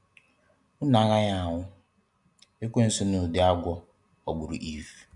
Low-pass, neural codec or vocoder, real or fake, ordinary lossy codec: 10.8 kHz; none; real; MP3, 96 kbps